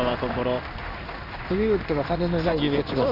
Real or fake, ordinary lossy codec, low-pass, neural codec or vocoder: fake; none; 5.4 kHz; codec, 16 kHz in and 24 kHz out, 1 kbps, XY-Tokenizer